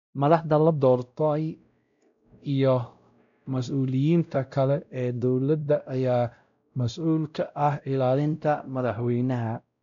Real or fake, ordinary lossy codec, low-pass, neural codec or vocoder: fake; none; 7.2 kHz; codec, 16 kHz, 0.5 kbps, X-Codec, WavLM features, trained on Multilingual LibriSpeech